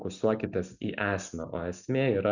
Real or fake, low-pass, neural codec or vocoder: real; 7.2 kHz; none